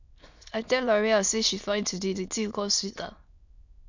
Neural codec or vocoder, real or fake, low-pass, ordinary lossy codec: autoencoder, 22.05 kHz, a latent of 192 numbers a frame, VITS, trained on many speakers; fake; 7.2 kHz; none